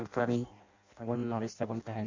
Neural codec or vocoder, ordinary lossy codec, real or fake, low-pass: codec, 16 kHz in and 24 kHz out, 0.6 kbps, FireRedTTS-2 codec; MP3, 64 kbps; fake; 7.2 kHz